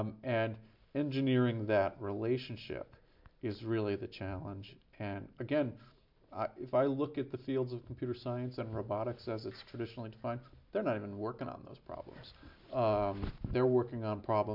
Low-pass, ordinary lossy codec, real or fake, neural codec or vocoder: 5.4 kHz; MP3, 48 kbps; real; none